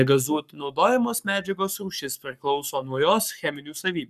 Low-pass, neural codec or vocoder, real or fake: 14.4 kHz; codec, 44.1 kHz, 7.8 kbps, Pupu-Codec; fake